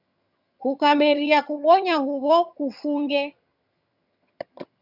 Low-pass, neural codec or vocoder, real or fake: 5.4 kHz; vocoder, 22.05 kHz, 80 mel bands, HiFi-GAN; fake